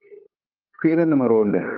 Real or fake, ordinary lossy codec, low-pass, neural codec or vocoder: fake; Opus, 32 kbps; 5.4 kHz; codec, 16 kHz, 8 kbps, FunCodec, trained on LibriTTS, 25 frames a second